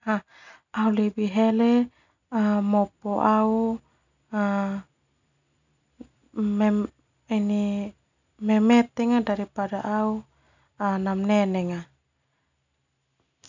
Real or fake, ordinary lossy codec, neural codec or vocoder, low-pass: real; none; none; 7.2 kHz